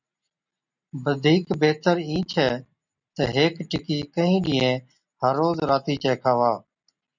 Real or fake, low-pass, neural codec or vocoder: real; 7.2 kHz; none